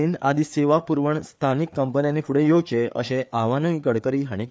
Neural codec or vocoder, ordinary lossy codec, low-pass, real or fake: codec, 16 kHz, 4 kbps, FreqCodec, larger model; none; none; fake